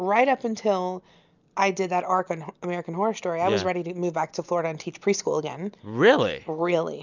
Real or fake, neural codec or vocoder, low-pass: real; none; 7.2 kHz